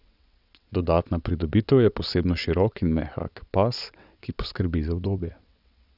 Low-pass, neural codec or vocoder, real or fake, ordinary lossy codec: 5.4 kHz; vocoder, 22.05 kHz, 80 mel bands, WaveNeXt; fake; none